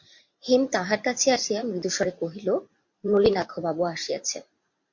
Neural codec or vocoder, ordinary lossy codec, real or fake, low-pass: vocoder, 44.1 kHz, 128 mel bands every 512 samples, BigVGAN v2; MP3, 48 kbps; fake; 7.2 kHz